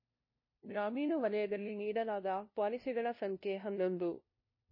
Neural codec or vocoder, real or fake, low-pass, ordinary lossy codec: codec, 16 kHz, 0.5 kbps, FunCodec, trained on LibriTTS, 25 frames a second; fake; 5.4 kHz; MP3, 24 kbps